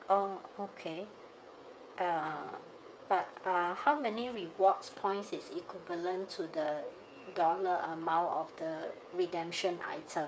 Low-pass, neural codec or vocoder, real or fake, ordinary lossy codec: none; codec, 16 kHz, 8 kbps, FreqCodec, smaller model; fake; none